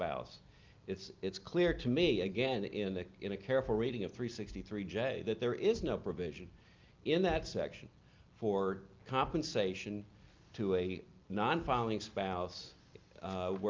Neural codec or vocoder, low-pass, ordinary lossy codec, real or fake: none; 7.2 kHz; Opus, 24 kbps; real